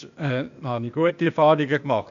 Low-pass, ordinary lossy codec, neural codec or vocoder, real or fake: 7.2 kHz; none; codec, 16 kHz, 0.8 kbps, ZipCodec; fake